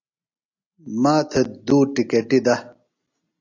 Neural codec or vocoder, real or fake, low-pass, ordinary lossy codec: none; real; 7.2 kHz; AAC, 48 kbps